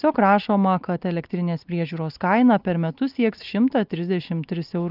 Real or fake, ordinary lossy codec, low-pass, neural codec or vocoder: real; Opus, 32 kbps; 5.4 kHz; none